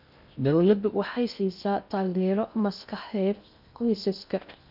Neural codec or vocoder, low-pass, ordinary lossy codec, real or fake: codec, 16 kHz in and 24 kHz out, 0.6 kbps, FocalCodec, streaming, 2048 codes; 5.4 kHz; none; fake